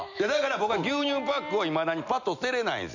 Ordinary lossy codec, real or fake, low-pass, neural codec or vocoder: none; real; 7.2 kHz; none